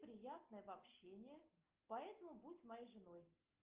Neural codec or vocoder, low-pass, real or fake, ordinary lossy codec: none; 3.6 kHz; real; Opus, 32 kbps